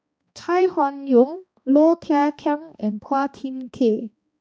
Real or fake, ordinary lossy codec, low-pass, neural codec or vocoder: fake; none; none; codec, 16 kHz, 1 kbps, X-Codec, HuBERT features, trained on balanced general audio